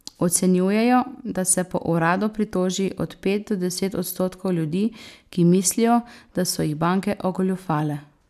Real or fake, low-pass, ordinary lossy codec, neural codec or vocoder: real; 14.4 kHz; none; none